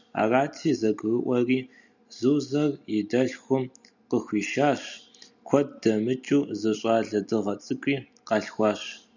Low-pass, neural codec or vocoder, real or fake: 7.2 kHz; none; real